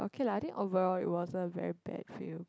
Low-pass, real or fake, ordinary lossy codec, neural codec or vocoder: none; real; none; none